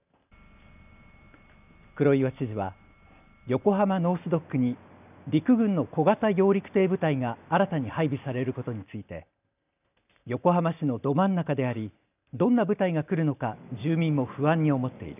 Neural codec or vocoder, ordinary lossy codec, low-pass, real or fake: none; none; 3.6 kHz; real